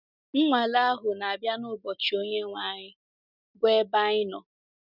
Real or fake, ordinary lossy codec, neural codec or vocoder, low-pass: real; none; none; 5.4 kHz